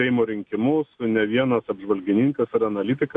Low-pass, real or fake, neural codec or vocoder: 9.9 kHz; real; none